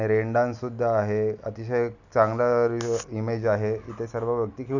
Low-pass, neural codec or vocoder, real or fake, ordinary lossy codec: 7.2 kHz; none; real; none